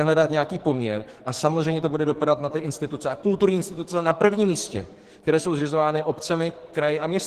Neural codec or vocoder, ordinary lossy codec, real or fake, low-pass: codec, 44.1 kHz, 2.6 kbps, SNAC; Opus, 16 kbps; fake; 14.4 kHz